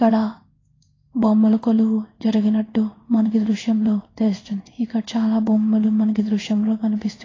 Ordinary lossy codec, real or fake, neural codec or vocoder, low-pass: AAC, 32 kbps; fake; codec, 16 kHz in and 24 kHz out, 1 kbps, XY-Tokenizer; 7.2 kHz